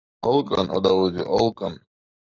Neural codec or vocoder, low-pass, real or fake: codec, 44.1 kHz, 7.8 kbps, Pupu-Codec; 7.2 kHz; fake